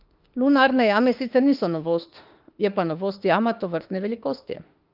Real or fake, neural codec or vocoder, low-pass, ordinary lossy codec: fake; autoencoder, 48 kHz, 32 numbers a frame, DAC-VAE, trained on Japanese speech; 5.4 kHz; Opus, 32 kbps